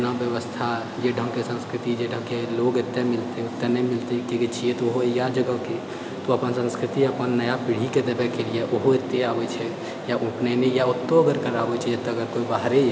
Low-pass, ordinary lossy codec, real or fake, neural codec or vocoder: none; none; real; none